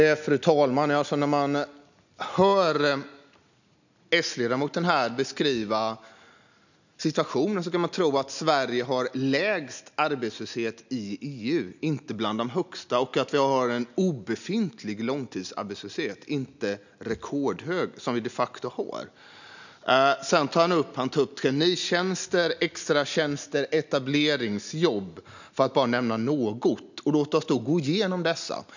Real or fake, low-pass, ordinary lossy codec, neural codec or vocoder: real; 7.2 kHz; none; none